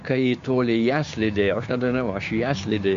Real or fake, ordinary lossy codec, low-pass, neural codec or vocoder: fake; MP3, 48 kbps; 7.2 kHz; codec, 16 kHz, 6 kbps, DAC